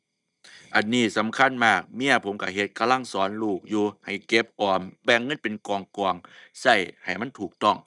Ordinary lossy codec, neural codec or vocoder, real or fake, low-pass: none; none; real; 10.8 kHz